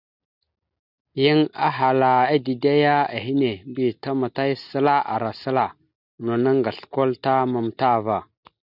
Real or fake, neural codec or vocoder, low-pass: real; none; 5.4 kHz